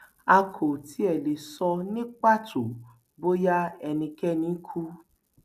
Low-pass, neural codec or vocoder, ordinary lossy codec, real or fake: 14.4 kHz; none; none; real